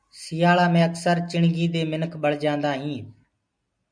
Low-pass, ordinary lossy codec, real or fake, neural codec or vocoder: 9.9 kHz; MP3, 96 kbps; real; none